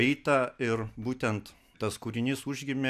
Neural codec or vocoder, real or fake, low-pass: none; real; 14.4 kHz